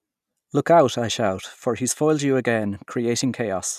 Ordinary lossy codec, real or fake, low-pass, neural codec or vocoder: none; real; 14.4 kHz; none